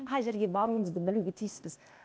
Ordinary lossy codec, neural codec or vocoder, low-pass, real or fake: none; codec, 16 kHz, 0.8 kbps, ZipCodec; none; fake